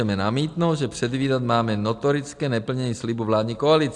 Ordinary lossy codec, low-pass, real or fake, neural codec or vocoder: AAC, 64 kbps; 10.8 kHz; real; none